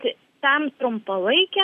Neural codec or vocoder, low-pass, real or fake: none; 14.4 kHz; real